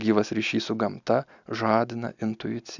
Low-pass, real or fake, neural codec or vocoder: 7.2 kHz; real; none